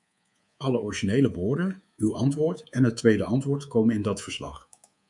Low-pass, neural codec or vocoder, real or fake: 10.8 kHz; codec, 24 kHz, 3.1 kbps, DualCodec; fake